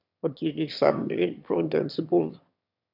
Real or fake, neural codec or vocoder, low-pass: fake; autoencoder, 22.05 kHz, a latent of 192 numbers a frame, VITS, trained on one speaker; 5.4 kHz